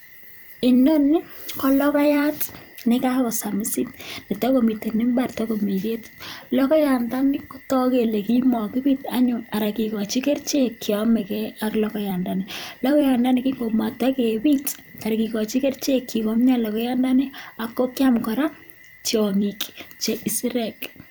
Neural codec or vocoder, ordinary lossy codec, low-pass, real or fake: vocoder, 44.1 kHz, 128 mel bands every 256 samples, BigVGAN v2; none; none; fake